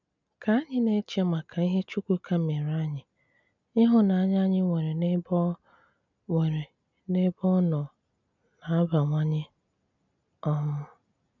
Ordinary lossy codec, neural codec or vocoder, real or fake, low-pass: none; none; real; 7.2 kHz